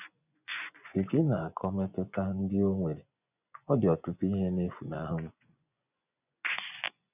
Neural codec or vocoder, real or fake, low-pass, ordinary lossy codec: vocoder, 24 kHz, 100 mel bands, Vocos; fake; 3.6 kHz; none